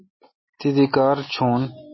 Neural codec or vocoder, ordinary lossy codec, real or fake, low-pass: none; MP3, 24 kbps; real; 7.2 kHz